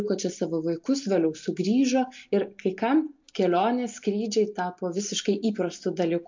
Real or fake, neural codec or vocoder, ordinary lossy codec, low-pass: real; none; MP3, 48 kbps; 7.2 kHz